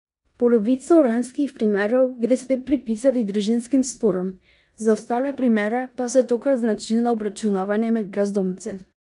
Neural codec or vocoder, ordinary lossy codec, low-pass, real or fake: codec, 16 kHz in and 24 kHz out, 0.9 kbps, LongCat-Audio-Codec, four codebook decoder; none; 10.8 kHz; fake